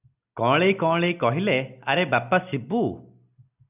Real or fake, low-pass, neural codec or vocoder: real; 3.6 kHz; none